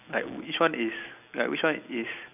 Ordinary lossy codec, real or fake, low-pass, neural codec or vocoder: none; real; 3.6 kHz; none